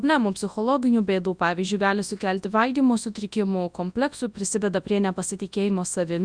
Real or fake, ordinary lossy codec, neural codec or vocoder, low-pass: fake; Opus, 64 kbps; codec, 24 kHz, 0.9 kbps, WavTokenizer, large speech release; 9.9 kHz